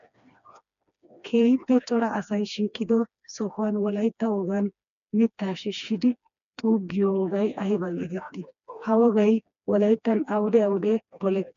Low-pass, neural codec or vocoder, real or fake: 7.2 kHz; codec, 16 kHz, 2 kbps, FreqCodec, smaller model; fake